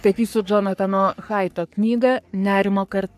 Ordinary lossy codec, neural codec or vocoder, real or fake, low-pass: AAC, 96 kbps; codec, 44.1 kHz, 3.4 kbps, Pupu-Codec; fake; 14.4 kHz